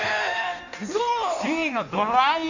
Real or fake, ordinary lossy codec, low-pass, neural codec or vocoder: fake; none; 7.2 kHz; codec, 16 kHz in and 24 kHz out, 1.1 kbps, FireRedTTS-2 codec